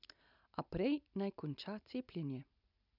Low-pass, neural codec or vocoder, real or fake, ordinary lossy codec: 5.4 kHz; none; real; none